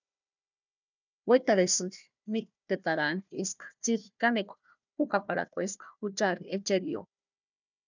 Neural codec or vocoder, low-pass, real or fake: codec, 16 kHz, 1 kbps, FunCodec, trained on Chinese and English, 50 frames a second; 7.2 kHz; fake